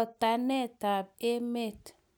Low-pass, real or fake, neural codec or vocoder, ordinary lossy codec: none; real; none; none